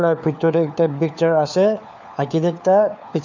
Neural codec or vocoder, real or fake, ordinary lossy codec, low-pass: codec, 16 kHz, 4 kbps, FunCodec, trained on LibriTTS, 50 frames a second; fake; none; 7.2 kHz